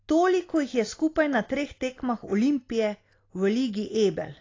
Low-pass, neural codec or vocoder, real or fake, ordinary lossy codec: 7.2 kHz; none; real; AAC, 32 kbps